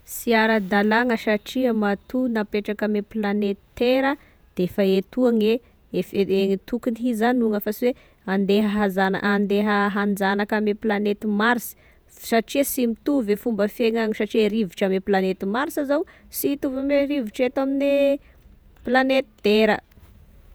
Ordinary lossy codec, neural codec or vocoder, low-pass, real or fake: none; vocoder, 48 kHz, 128 mel bands, Vocos; none; fake